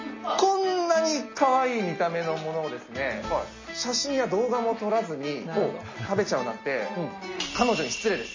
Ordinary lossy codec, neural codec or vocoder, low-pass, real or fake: MP3, 32 kbps; none; 7.2 kHz; real